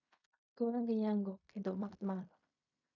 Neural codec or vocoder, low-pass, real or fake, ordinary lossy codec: codec, 16 kHz in and 24 kHz out, 0.4 kbps, LongCat-Audio-Codec, fine tuned four codebook decoder; 7.2 kHz; fake; AAC, 48 kbps